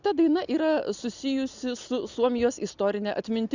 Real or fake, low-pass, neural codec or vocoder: real; 7.2 kHz; none